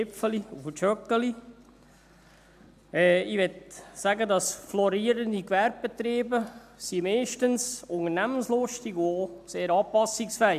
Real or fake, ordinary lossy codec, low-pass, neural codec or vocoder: real; AAC, 96 kbps; 14.4 kHz; none